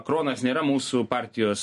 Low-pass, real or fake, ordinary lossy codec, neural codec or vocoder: 14.4 kHz; real; MP3, 48 kbps; none